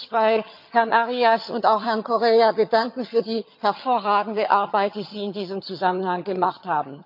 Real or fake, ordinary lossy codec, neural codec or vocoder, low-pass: fake; MP3, 48 kbps; vocoder, 22.05 kHz, 80 mel bands, HiFi-GAN; 5.4 kHz